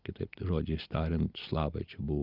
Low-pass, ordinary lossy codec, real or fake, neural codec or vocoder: 5.4 kHz; Opus, 32 kbps; real; none